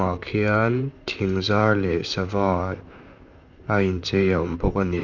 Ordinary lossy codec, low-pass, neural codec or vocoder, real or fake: none; 7.2 kHz; vocoder, 44.1 kHz, 128 mel bands, Pupu-Vocoder; fake